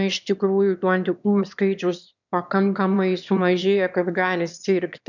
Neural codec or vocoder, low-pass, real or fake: autoencoder, 22.05 kHz, a latent of 192 numbers a frame, VITS, trained on one speaker; 7.2 kHz; fake